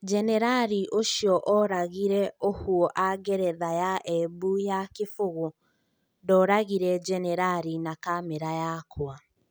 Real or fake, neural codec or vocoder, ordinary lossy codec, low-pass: real; none; none; none